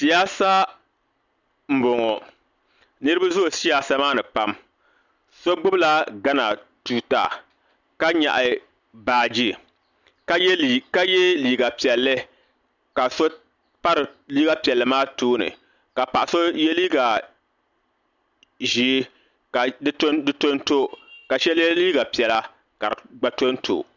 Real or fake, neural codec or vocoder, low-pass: real; none; 7.2 kHz